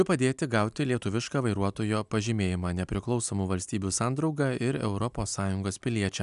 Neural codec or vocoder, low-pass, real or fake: none; 10.8 kHz; real